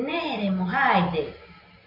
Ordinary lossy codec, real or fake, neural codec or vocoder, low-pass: MP3, 32 kbps; real; none; 5.4 kHz